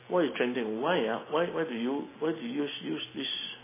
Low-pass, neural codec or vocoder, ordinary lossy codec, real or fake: 3.6 kHz; none; MP3, 16 kbps; real